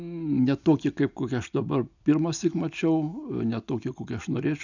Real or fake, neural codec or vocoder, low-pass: real; none; 7.2 kHz